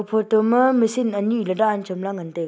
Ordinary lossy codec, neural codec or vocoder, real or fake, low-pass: none; none; real; none